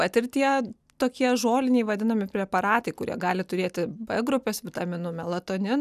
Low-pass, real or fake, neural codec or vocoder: 14.4 kHz; real; none